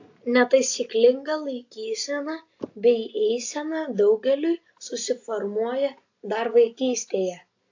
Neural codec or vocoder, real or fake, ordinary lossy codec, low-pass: none; real; AAC, 48 kbps; 7.2 kHz